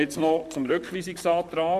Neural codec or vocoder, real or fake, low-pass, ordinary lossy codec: vocoder, 44.1 kHz, 128 mel bands, Pupu-Vocoder; fake; 14.4 kHz; none